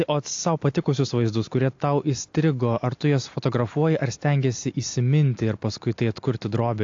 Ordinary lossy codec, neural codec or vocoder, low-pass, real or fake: AAC, 48 kbps; none; 7.2 kHz; real